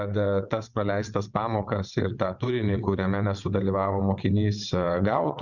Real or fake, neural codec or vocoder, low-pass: fake; vocoder, 22.05 kHz, 80 mel bands, WaveNeXt; 7.2 kHz